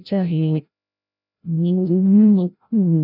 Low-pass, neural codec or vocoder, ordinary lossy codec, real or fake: 5.4 kHz; codec, 16 kHz, 0.5 kbps, FreqCodec, larger model; none; fake